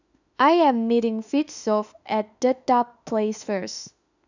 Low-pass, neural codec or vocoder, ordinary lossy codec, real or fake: 7.2 kHz; autoencoder, 48 kHz, 32 numbers a frame, DAC-VAE, trained on Japanese speech; none; fake